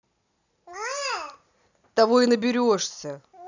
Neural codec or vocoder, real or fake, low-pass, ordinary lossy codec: none; real; 7.2 kHz; none